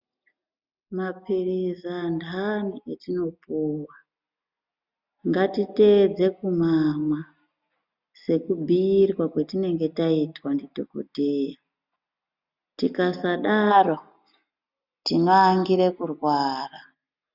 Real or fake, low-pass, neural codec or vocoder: real; 5.4 kHz; none